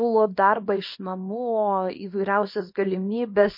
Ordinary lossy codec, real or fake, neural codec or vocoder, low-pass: MP3, 32 kbps; fake; codec, 24 kHz, 0.9 kbps, WavTokenizer, small release; 5.4 kHz